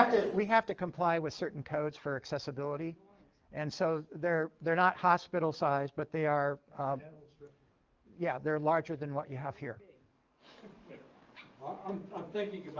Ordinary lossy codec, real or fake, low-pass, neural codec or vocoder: Opus, 24 kbps; fake; 7.2 kHz; codec, 44.1 kHz, 7.8 kbps, DAC